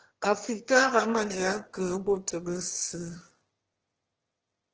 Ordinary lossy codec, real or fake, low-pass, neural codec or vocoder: Opus, 16 kbps; fake; 7.2 kHz; autoencoder, 22.05 kHz, a latent of 192 numbers a frame, VITS, trained on one speaker